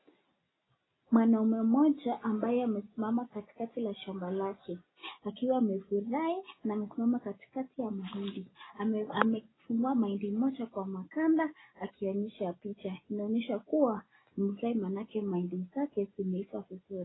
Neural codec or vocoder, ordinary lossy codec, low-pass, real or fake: none; AAC, 16 kbps; 7.2 kHz; real